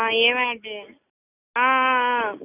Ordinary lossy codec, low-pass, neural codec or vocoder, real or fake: none; 3.6 kHz; none; real